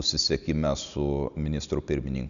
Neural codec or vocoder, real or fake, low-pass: none; real; 7.2 kHz